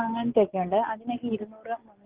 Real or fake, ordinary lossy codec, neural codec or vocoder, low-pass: real; Opus, 16 kbps; none; 3.6 kHz